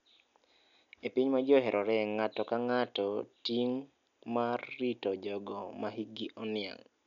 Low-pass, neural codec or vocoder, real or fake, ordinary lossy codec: 7.2 kHz; none; real; none